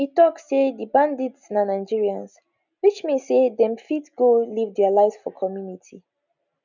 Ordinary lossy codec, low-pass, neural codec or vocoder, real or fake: none; 7.2 kHz; none; real